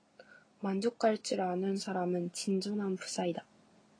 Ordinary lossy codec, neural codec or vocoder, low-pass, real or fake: AAC, 32 kbps; none; 9.9 kHz; real